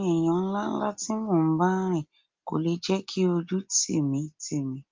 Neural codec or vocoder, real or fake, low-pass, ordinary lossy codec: none; real; 7.2 kHz; Opus, 32 kbps